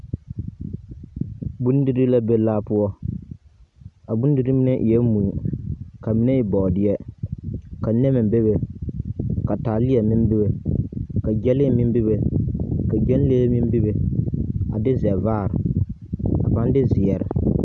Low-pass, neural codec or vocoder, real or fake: 10.8 kHz; none; real